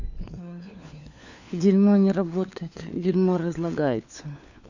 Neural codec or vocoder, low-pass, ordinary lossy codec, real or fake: codec, 16 kHz, 4 kbps, FunCodec, trained on LibriTTS, 50 frames a second; 7.2 kHz; none; fake